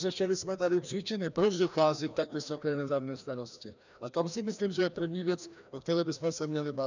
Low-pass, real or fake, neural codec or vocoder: 7.2 kHz; fake; codec, 16 kHz, 1 kbps, FreqCodec, larger model